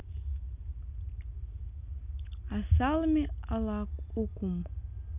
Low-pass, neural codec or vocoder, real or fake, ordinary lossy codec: 3.6 kHz; none; real; none